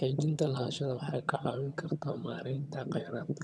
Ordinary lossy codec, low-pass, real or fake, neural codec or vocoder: none; none; fake; vocoder, 22.05 kHz, 80 mel bands, HiFi-GAN